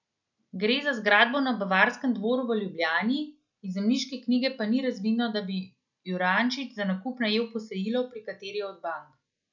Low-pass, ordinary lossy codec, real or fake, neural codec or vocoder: 7.2 kHz; none; real; none